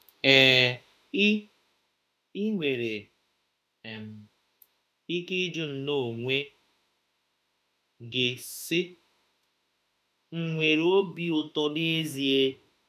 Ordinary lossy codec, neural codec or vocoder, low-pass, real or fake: none; autoencoder, 48 kHz, 32 numbers a frame, DAC-VAE, trained on Japanese speech; 14.4 kHz; fake